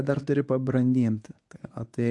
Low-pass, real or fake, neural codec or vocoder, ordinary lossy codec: 10.8 kHz; fake; codec, 24 kHz, 0.9 kbps, WavTokenizer, medium speech release version 1; AAC, 64 kbps